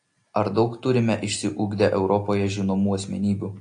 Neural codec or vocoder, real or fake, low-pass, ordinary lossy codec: none; real; 9.9 kHz; AAC, 48 kbps